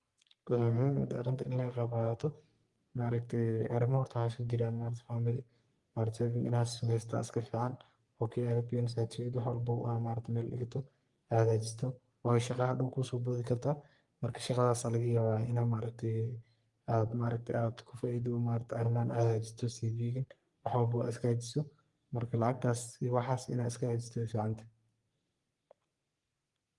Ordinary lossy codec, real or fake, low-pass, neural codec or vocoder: Opus, 32 kbps; fake; 10.8 kHz; codec, 44.1 kHz, 3.4 kbps, Pupu-Codec